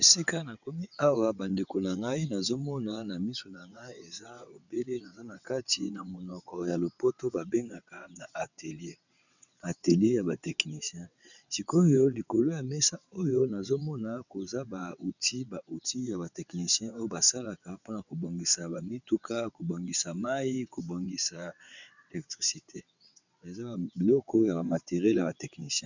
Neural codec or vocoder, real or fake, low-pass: vocoder, 22.05 kHz, 80 mel bands, WaveNeXt; fake; 7.2 kHz